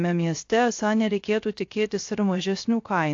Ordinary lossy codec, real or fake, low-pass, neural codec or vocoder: AAC, 48 kbps; fake; 7.2 kHz; codec, 16 kHz, 0.3 kbps, FocalCodec